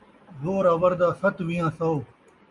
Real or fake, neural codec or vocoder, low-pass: real; none; 10.8 kHz